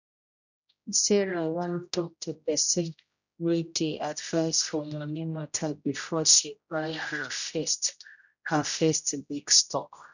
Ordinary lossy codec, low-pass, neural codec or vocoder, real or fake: none; 7.2 kHz; codec, 16 kHz, 0.5 kbps, X-Codec, HuBERT features, trained on general audio; fake